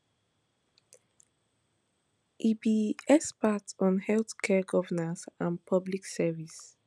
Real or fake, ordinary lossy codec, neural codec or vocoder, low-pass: real; none; none; none